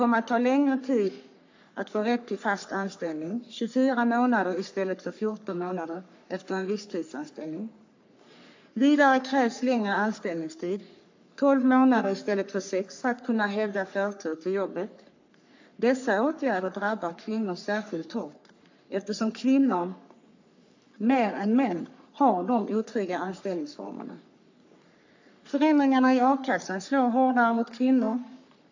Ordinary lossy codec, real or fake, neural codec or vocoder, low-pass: AAC, 48 kbps; fake; codec, 44.1 kHz, 3.4 kbps, Pupu-Codec; 7.2 kHz